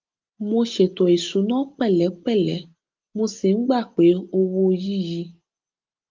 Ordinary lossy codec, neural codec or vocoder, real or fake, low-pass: Opus, 24 kbps; none; real; 7.2 kHz